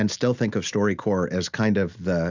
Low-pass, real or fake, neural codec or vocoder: 7.2 kHz; real; none